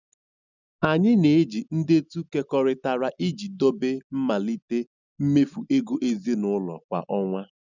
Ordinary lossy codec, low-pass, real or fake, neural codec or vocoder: none; 7.2 kHz; real; none